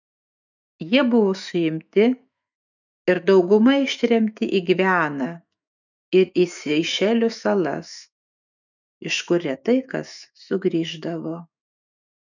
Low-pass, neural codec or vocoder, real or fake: 7.2 kHz; autoencoder, 48 kHz, 128 numbers a frame, DAC-VAE, trained on Japanese speech; fake